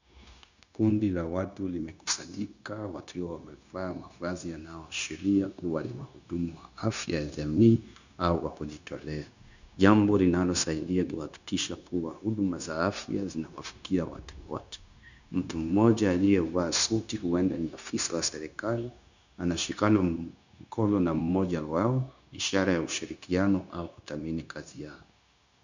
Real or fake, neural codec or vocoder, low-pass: fake; codec, 16 kHz, 0.9 kbps, LongCat-Audio-Codec; 7.2 kHz